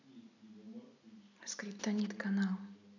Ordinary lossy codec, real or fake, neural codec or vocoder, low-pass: none; real; none; 7.2 kHz